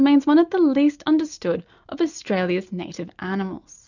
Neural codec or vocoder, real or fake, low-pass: none; real; 7.2 kHz